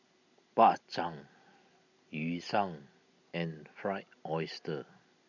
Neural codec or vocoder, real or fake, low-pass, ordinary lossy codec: none; real; 7.2 kHz; none